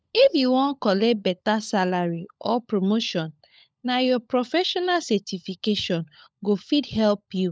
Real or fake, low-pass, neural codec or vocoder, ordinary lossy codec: fake; none; codec, 16 kHz, 16 kbps, FunCodec, trained on LibriTTS, 50 frames a second; none